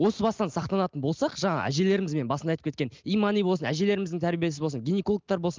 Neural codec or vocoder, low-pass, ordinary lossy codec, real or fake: none; 7.2 kHz; Opus, 32 kbps; real